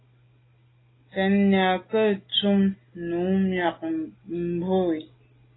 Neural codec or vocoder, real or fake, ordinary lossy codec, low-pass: none; real; AAC, 16 kbps; 7.2 kHz